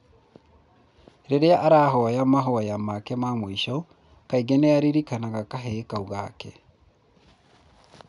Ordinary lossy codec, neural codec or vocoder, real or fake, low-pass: none; none; real; 10.8 kHz